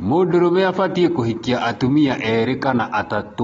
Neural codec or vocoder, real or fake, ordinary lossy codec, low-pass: none; real; AAC, 24 kbps; 19.8 kHz